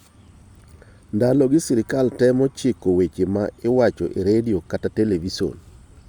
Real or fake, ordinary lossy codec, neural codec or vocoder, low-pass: real; Opus, 64 kbps; none; 19.8 kHz